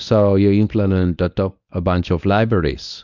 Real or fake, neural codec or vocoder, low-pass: fake; codec, 24 kHz, 0.9 kbps, WavTokenizer, medium speech release version 1; 7.2 kHz